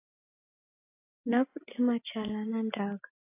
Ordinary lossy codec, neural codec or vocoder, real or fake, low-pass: AAC, 16 kbps; none; real; 3.6 kHz